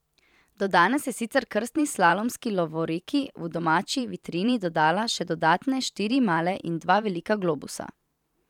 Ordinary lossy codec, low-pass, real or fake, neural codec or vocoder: none; 19.8 kHz; fake; vocoder, 44.1 kHz, 128 mel bands every 512 samples, BigVGAN v2